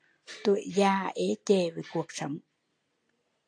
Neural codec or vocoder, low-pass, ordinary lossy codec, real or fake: none; 9.9 kHz; AAC, 48 kbps; real